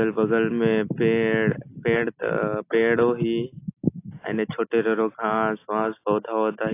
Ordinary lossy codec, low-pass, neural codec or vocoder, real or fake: AAC, 24 kbps; 3.6 kHz; none; real